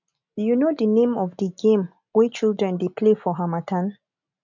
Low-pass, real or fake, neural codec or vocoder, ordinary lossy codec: 7.2 kHz; real; none; none